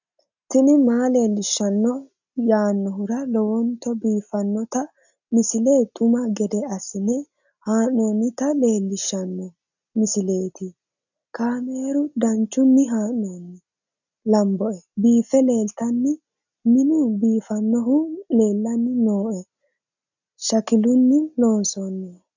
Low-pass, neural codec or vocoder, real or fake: 7.2 kHz; none; real